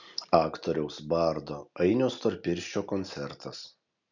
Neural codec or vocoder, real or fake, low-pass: none; real; 7.2 kHz